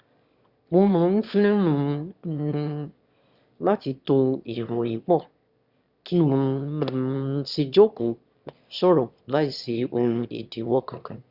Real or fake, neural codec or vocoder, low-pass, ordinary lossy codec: fake; autoencoder, 22.05 kHz, a latent of 192 numbers a frame, VITS, trained on one speaker; 5.4 kHz; Opus, 64 kbps